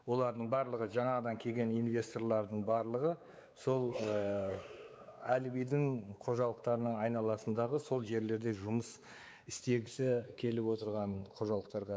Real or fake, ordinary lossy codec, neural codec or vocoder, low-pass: fake; none; codec, 16 kHz, 4 kbps, X-Codec, WavLM features, trained on Multilingual LibriSpeech; none